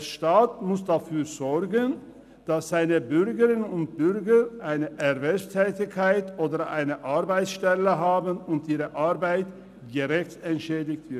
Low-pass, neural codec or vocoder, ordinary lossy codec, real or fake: 14.4 kHz; none; none; real